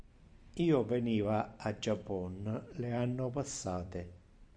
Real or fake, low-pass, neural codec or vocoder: fake; 9.9 kHz; vocoder, 44.1 kHz, 128 mel bands every 256 samples, BigVGAN v2